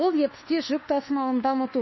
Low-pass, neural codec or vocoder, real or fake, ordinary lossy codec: 7.2 kHz; codec, 24 kHz, 1.2 kbps, DualCodec; fake; MP3, 24 kbps